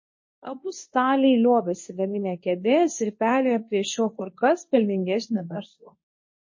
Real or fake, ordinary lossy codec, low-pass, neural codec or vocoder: fake; MP3, 32 kbps; 7.2 kHz; codec, 24 kHz, 0.9 kbps, WavTokenizer, medium speech release version 2